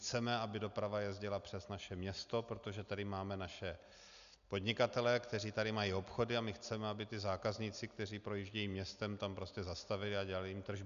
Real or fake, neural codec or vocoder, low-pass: real; none; 7.2 kHz